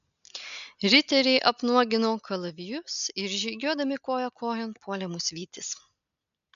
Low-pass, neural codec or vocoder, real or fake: 7.2 kHz; none; real